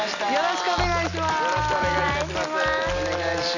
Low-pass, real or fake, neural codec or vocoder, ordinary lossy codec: 7.2 kHz; real; none; none